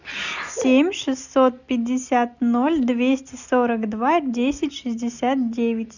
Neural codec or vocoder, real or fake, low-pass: none; real; 7.2 kHz